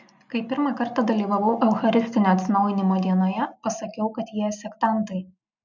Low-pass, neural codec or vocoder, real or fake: 7.2 kHz; none; real